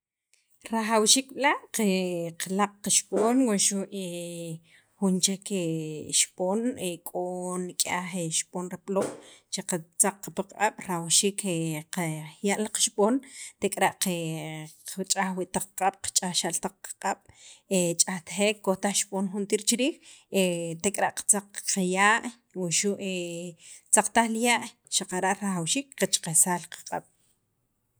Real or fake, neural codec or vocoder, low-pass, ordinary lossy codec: real; none; none; none